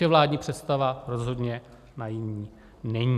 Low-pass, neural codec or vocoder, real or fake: 14.4 kHz; none; real